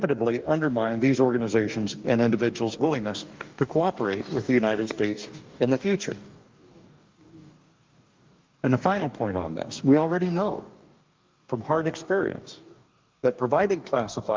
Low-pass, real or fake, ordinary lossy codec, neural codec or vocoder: 7.2 kHz; fake; Opus, 24 kbps; codec, 44.1 kHz, 2.6 kbps, DAC